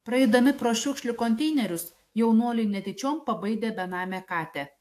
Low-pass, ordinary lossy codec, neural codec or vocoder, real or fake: 14.4 kHz; AAC, 64 kbps; autoencoder, 48 kHz, 128 numbers a frame, DAC-VAE, trained on Japanese speech; fake